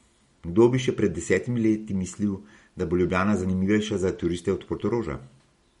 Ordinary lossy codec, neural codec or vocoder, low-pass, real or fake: MP3, 48 kbps; none; 19.8 kHz; real